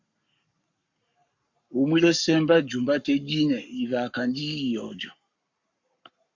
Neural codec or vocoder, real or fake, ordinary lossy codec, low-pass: codec, 44.1 kHz, 7.8 kbps, Pupu-Codec; fake; Opus, 64 kbps; 7.2 kHz